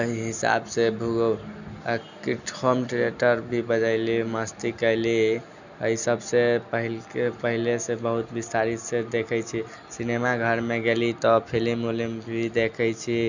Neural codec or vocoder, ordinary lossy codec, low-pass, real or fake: none; none; 7.2 kHz; real